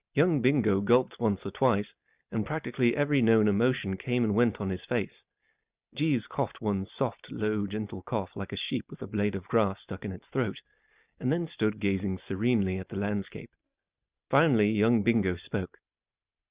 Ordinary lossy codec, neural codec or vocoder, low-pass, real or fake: Opus, 64 kbps; none; 3.6 kHz; real